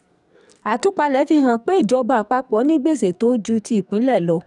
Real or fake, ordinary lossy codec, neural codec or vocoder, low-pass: fake; none; codec, 44.1 kHz, 2.6 kbps, SNAC; 10.8 kHz